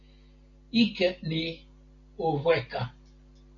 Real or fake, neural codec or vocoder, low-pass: real; none; 7.2 kHz